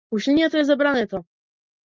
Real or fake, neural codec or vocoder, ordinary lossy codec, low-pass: real; none; Opus, 32 kbps; 7.2 kHz